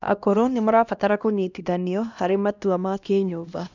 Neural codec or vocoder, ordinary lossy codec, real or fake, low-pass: codec, 16 kHz, 1 kbps, X-Codec, HuBERT features, trained on LibriSpeech; none; fake; 7.2 kHz